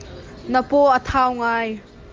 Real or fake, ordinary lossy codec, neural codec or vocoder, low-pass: real; Opus, 24 kbps; none; 7.2 kHz